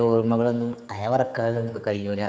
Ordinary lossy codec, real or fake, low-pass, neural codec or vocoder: none; fake; none; codec, 16 kHz, 4 kbps, X-Codec, HuBERT features, trained on general audio